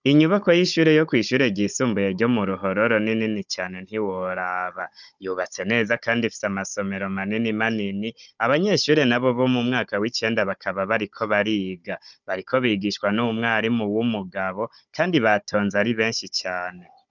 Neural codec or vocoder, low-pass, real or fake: codec, 16 kHz, 16 kbps, FunCodec, trained on Chinese and English, 50 frames a second; 7.2 kHz; fake